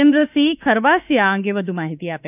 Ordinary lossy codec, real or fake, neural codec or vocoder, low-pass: none; fake; autoencoder, 48 kHz, 32 numbers a frame, DAC-VAE, trained on Japanese speech; 3.6 kHz